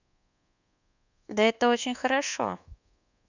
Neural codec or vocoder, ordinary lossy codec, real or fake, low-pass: codec, 24 kHz, 1.2 kbps, DualCodec; none; fake; 7.2 kHz